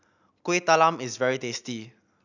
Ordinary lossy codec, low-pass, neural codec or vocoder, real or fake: none; 7.2 kHz; none; real